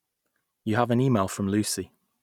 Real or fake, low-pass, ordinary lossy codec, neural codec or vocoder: real; 19.8 kHz; none; none